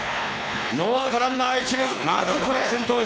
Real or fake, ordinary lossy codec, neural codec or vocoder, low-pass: fake; none; codec, 16 kHz, 2 kbps, X-Codec, WavLM features, trained on Multilingual LibriSpeech; none